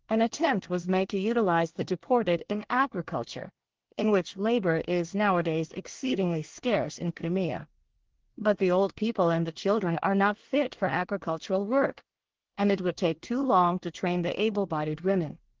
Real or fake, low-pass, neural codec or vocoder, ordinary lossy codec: fake; 7.2 kHz; codec, 24 kHz, 1 kbps, SNAC; Opus, 16 kbps